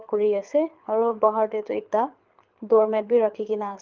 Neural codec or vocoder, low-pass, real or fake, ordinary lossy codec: codec, 24 kHz, 6 kbps, HILCodec; 7.2 kHz; fake; Opus, 32 kbps